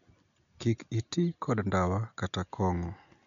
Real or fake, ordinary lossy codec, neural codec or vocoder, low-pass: real; none; none; 7.2 kHz